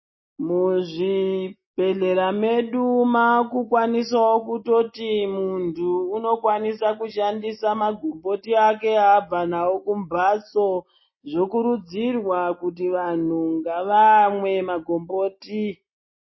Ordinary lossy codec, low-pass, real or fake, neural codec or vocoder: MP3, 24 kbps; 7.2 kHz; real; none